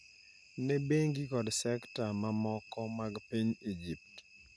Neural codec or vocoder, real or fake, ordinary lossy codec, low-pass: none; real; none; none